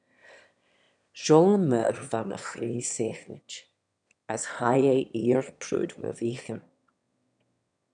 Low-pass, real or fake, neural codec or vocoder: 9.9 kHz; fake; autoencoder, 22.05 kHz, a latent of 192 numbers a frame, VITS, trained on one speaker